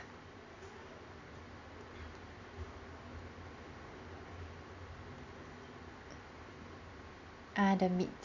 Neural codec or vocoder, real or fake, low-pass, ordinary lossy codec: none; real; 7.2 kHz; Opus, 64 kbps